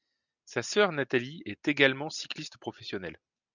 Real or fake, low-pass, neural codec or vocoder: real; 7.2 kHz; none